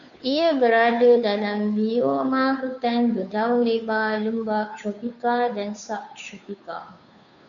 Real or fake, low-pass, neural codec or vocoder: fake; 7.2 kHz; codec, 16 kHz, 2 kbps, FunCodec, trained on Chinese and English, 25 frames a second